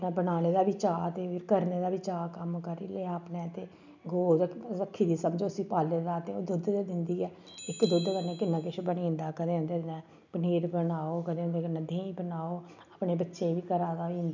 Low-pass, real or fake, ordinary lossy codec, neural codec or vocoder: 7.2 kHz; real; none; none